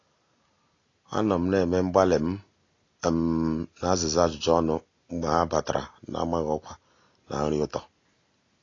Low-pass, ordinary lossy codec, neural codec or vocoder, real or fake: 7.2 kHz; AAC, 32 kbps; none; real